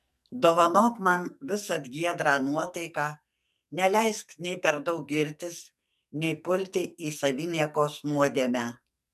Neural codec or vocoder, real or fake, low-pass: codec, 44.1 kHz, 2.6 kbps, SNAC; fake; 14.4 kHz